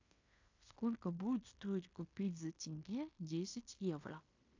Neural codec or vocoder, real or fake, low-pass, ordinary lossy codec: codec, 16 kHz in and 24 kHz out, 0.9 kbps, LongCat-Audio-Codec, fine tuned four codebook decoder; fake; 7.2 kHz; AAC, 48 kbps